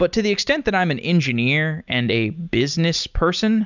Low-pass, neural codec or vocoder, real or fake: 7.2 kHz; none; real